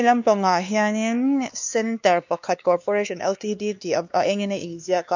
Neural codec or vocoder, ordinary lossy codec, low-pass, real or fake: codec, 16 kHz, 2 kbps, X-Codec, WavLM features, trained on Multilingual LibriSpeech; none; 7.2 kHz; fake